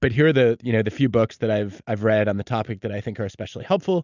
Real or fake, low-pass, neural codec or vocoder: real; 7.2 kHz; none